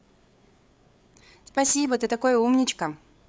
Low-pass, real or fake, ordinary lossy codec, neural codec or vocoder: none; fake; none; codec, 16 kHz, 8 kbps, FreqCodec, larger model